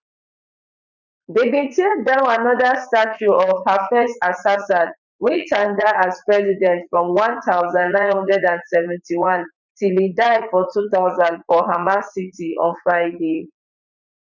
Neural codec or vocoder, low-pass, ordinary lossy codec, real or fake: vocoder, 44.1 kHz, 80 mel bands, Vocos; 7.2 kHz; none; fake